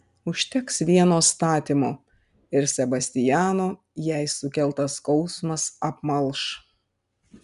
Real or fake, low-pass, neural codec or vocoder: real; 10.8 kHz; none